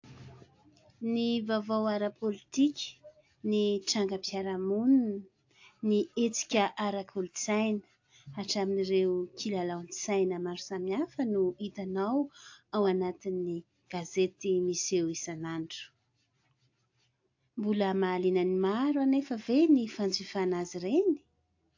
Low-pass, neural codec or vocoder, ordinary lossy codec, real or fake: 7.2 kHz; none; AAC, 48 kbps; real